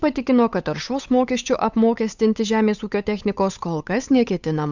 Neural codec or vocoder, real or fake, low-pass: codec, 16 kHz, 8 kbps, FunCodec, trained on Chinese and English, 25 frames a second; fake; 7.2 kHz